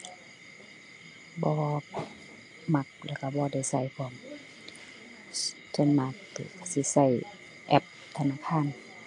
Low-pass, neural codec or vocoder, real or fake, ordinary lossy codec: 10.8 kHz; none; real; AAC, 64 kbps